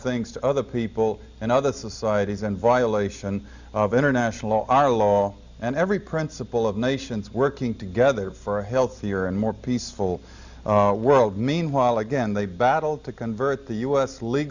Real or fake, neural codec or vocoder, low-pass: real; none; 7.2 kHz